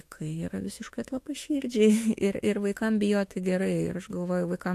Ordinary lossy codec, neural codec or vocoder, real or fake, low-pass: AAC, 64 kbps; autoencoder, 48 kHz, 32 numbers a frame, DAC-VAE, trained on Japanese speech; fake; 14.4 kHz